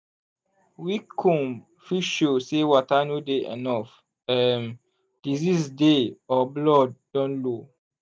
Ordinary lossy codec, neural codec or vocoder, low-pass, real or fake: none; none; none; real